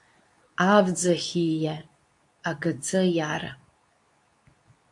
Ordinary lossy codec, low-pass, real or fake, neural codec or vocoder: AAC, 64 kbps; 10.8 kHz; fake; codec, 24 kHz, 0.9 kbps, WavTokenizer, medium speech release version 2